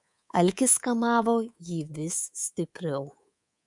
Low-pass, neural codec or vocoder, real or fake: 10.8 kHz; codec, 24 kHz, 3.1 kbps, DualCodec; fake